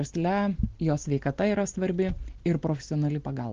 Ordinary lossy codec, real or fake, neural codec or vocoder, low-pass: Opus, 16 kbps; real; none; 7.2 kHz